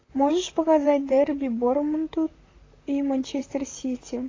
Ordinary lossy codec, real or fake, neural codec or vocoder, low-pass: AAC, 32 kbps; fake; vocoder, 44.1 kHz, 128 mel bands, Pupu-Vocoder; 7.2 kHz